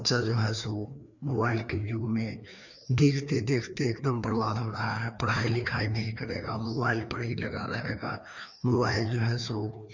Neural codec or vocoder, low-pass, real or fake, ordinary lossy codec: codec, 16 kHz, 2 kbps, FreqCodec, larger model; 7.2 kHz; fake; none